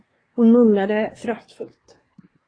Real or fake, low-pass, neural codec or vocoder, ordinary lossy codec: fake; 9.9 kHz; codec, 24 kHz, 1 kbps, SNAC; AAC, 32 kbps